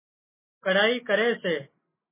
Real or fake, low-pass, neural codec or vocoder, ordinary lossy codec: real; 3.6 kHz; none; MP3, 16 kbps